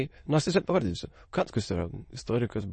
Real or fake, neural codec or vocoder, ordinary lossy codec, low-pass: fake; autoencoder, 22.05 kHz, a latent of 192 numbers a frame, VITS, trained on many speakers; MP3, 32 kbps; 9.9 kHz